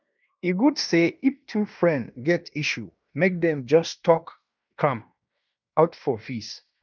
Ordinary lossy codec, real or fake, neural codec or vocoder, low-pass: none; fake; codec, 16 kHz in and 24 kHz out, 0.9 kbps, LongCat-Audio-Codec, fine tuned four codebook decoder; 7.2 kHz